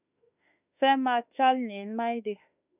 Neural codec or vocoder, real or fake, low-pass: autoencoder, 48 kHz, 32 numbers a frame, DAC-VAE, trained on Japanese speech; fake; 3.6 kHz